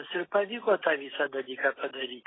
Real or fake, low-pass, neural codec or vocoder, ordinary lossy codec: real; 7.2 kHz; none; AAC, 16 kbps